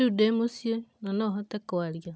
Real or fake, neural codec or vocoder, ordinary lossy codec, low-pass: real; none; none; none